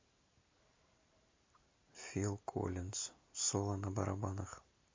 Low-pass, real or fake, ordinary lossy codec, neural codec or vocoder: 7.2 kHz; real; MP3, 32 kbps; none